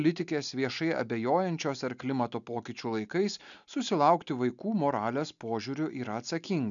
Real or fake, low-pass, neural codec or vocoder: real; 7.2 kHz; none